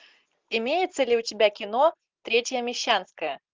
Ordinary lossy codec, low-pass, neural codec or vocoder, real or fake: Opus, 32 kbps; 7.2 kHz; none; real